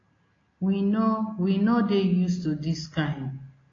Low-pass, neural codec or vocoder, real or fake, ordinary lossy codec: 7.2 kHz; none; real; AAC, 32 kbps